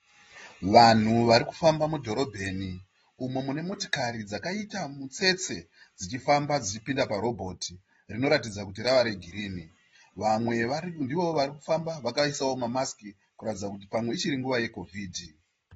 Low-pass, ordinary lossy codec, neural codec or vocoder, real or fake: 14.4 kHz; AAC, 24 kbps; none; real